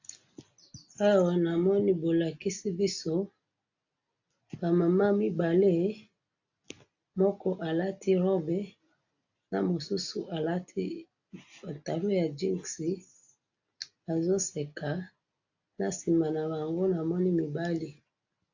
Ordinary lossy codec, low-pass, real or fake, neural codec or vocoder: AAC, 48 kbps; 7.2 kHz; real; none